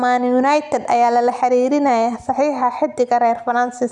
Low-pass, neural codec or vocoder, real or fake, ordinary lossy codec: 10.8 kHz; none; real; none